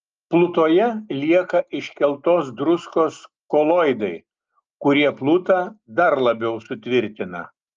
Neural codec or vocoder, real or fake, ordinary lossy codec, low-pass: none; real; Opus, 24 kbps; 7.2 kHz